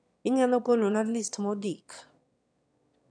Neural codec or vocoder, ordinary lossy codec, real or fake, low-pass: autoencoder, 22.05 kHz, a latent of 192 numbers a frame, VITS, trained on one speaker; none; fake; 9.9 kHz